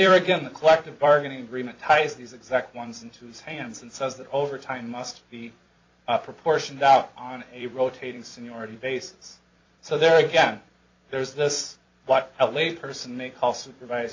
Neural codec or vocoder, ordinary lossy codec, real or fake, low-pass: vocoder, 44.1 kHz, 128 mel bands every 256 samples, BigVGAN v2; AAC, 48 kbps; fake; 7.2 kHz